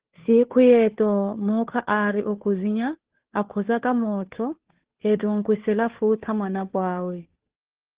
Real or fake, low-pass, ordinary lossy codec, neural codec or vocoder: fake; 3.6 kHz; Opus, 16 kbps; codec, 16 kHz, 2 kbps, FunCodec, trained on Chinese and English, 25 frames a second